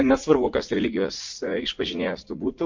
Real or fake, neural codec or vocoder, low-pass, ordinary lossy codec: fake; vocoder, 44.1 kHz, 80 mel bands, Vocos; 7.2 kHz; MP3, 48 kbps